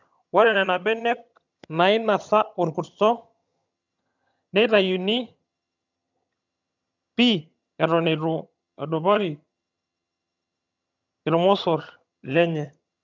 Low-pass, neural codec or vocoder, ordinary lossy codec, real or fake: 7.2 kHz; vocoder, 22.05 kHz, 80 mel bands, HiFi-GAN; none; fake